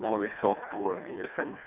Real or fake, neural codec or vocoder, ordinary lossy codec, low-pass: fake; codec, 24 kHz, 1.5 kbps, HILCodec; none; 3.6 kHz